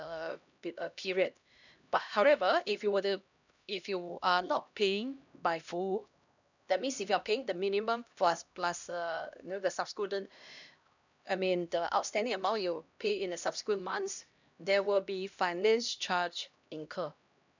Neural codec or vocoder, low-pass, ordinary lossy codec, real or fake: codec, 16 kHz, 1 kbps, X-Codec, HuBERT features, trained on LibriSpeech; 7.2 kHz; none; fake